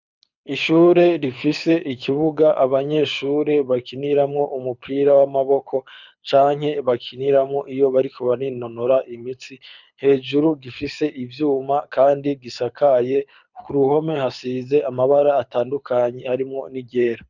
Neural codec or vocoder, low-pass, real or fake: codec, 24 kHz, 6 kbps, HILCodec; 7.2 kHz; fake